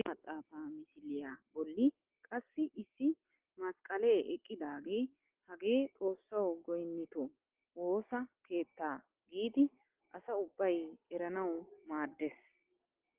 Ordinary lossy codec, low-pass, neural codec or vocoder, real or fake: Opus, 16 kbps; 3.6 kHz; none; real